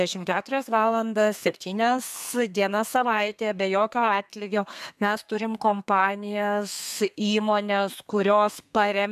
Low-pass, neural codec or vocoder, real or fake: 14.4 kHz; codec, 32 kHz, 1.9 kbps, SNAC; fake